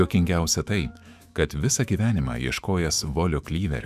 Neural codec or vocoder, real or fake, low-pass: autoencoder, 48 kHz, 128 numbers a frame, DAC-VAE, trained on Japanese speech; fake; 14.4 kHz